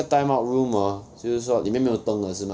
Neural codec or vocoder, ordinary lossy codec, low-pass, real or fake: none; none; none; real